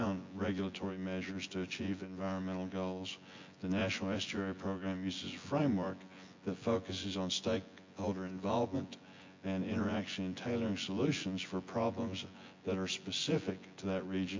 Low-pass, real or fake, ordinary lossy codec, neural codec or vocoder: 7.2 kHz; fake; MP3, 48 kbps; vocoder, 24 kHz, 100 mel bands, Vocos